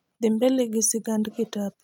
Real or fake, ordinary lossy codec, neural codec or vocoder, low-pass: real; none; none; 19.8 kHz